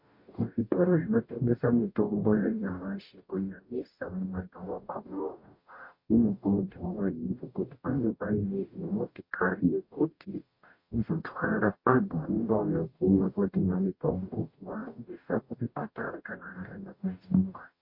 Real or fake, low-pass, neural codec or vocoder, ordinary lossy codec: fake; 5.4 kHz; codec, 44.1 kHz, 0.9 kbps, DAC; MP3, 32 kbps